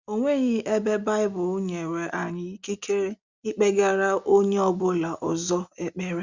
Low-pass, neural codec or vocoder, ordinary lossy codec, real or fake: 7.2 kHz; none; Opus, 64 kbps; real